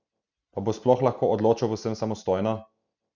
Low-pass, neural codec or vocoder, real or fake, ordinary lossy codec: 7.2 kHz; none; real; none